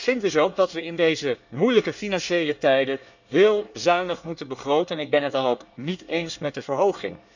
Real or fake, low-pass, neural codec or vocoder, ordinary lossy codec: fake; 7.2 kHz; codec, 24 kHz, 1 kbps, SNAC; none